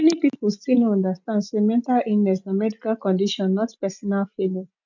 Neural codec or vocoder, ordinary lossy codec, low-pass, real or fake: none; none; 7.2 kHz; real